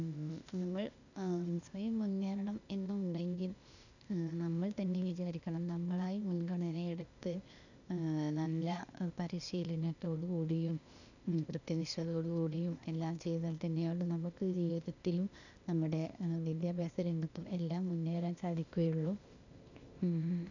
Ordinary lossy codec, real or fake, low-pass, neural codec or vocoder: none; fake; 7.2 kHz; codec, 16 kHz, 0.8 kbps, ZipCodec